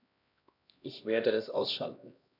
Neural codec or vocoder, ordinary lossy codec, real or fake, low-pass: codec, 16 kHz, 1 kbps, X-Codec, HuBERT features, trained on LibriSpeech; none; fake; 5.4 kHz